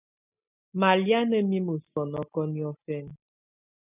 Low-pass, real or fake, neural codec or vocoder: 3.6 kHz; real; none